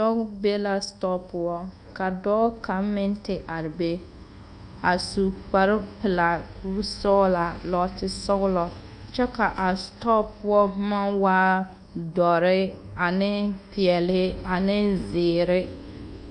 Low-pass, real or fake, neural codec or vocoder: 10.8 kHz; fake; codec, 24 kHz, 1.2 kbps, DualCodec